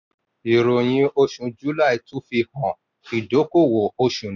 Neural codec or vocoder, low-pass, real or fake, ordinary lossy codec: none; 7.2 kHz; real; none